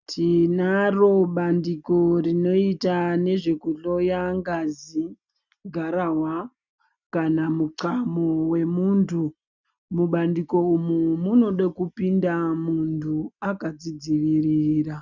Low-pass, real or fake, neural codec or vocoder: 7.2 kHz; real; none